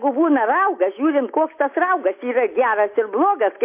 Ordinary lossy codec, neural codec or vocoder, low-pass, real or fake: MP3, 24 kbps; none; 3.6 kHz; real